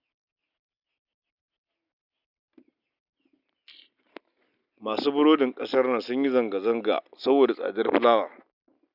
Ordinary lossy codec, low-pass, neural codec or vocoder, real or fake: none; 5.4 kHz; none; real